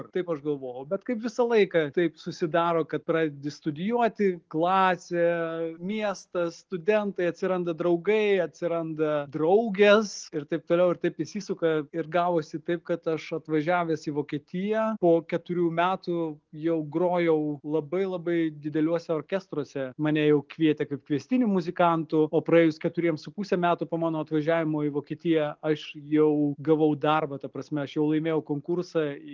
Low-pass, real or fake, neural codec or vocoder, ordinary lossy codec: 7.2 kHz; real; none; Opus, 24 kbps